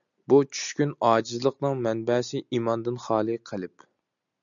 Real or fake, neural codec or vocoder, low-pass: real; none; 7.2 kHz